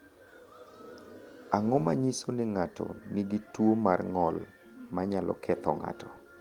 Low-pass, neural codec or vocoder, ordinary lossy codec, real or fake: 19.8 kHz; none; Opus, 24 kbps; real